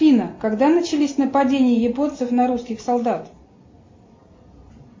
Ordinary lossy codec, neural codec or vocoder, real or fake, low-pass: MP3, 32 kbps; none; real; 7.2 kHz